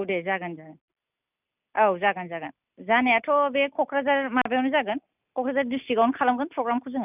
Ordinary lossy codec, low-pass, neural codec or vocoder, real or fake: none; 3.6 kHz; none; real